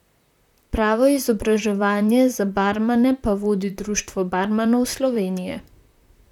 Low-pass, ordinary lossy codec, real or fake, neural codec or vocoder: 19.8 kHz; none; fake; vocoder, 44.1 kHz, 128 mel bands, Pupu-Vocoder